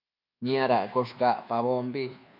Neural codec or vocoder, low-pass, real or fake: codec, 24 kHz, 1.2 kbps, DualCodec; 5.4 kHz; fake